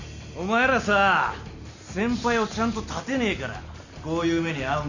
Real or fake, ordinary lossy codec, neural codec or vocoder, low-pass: real; none; none; 7.2 kHz